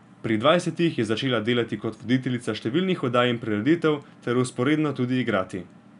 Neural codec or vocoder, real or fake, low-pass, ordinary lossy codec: none; real; 10.8 kHz; none